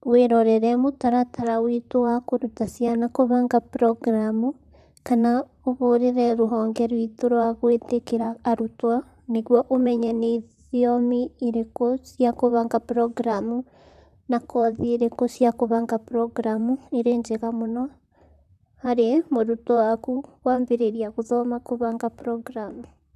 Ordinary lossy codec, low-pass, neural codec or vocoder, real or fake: none; 14.4 kHz; vocoder, 44.1 kHz, 128 mel bands, Pupu-Vocoder; fake